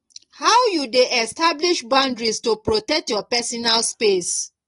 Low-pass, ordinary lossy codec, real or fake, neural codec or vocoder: 10.8 kHz; AAC, 32 kbps; real; none